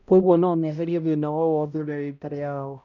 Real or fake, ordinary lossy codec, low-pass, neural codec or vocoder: fake; none; 7.2 kHz; codec, 16 kHz, 0.5 kbps, X-Codec, HuBERT features, trained on balanced general audio